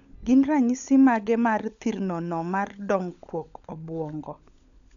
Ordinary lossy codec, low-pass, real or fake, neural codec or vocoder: none; 7.2 kHz; fake; codec, 16 kHz, 16 kbps, FunCodec, trained on LibriTTS, 50 frames a second